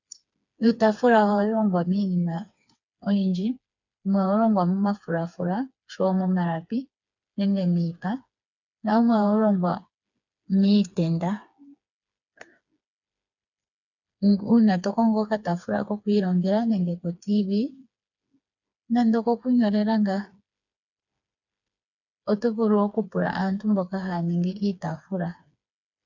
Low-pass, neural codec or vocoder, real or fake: 7.2 kHz; codec, 16 kHz, 4 kbps, FreqCodec, smaller model; fake